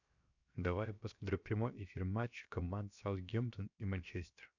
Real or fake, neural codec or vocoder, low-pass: fake; codec, 16 kHz, 0.7 kbps, FocalCodec; 7.2 kHz